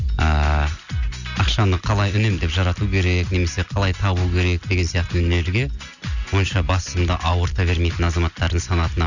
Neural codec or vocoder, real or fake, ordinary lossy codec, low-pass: none; real; none; 7.2 kHz